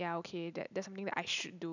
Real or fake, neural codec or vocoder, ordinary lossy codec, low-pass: real; none; none; 7.2 kHz